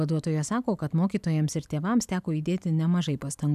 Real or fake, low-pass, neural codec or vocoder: real; 14.4 kHz; none